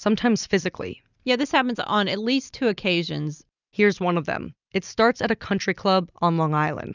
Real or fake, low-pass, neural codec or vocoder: real; 7.2 kHz; none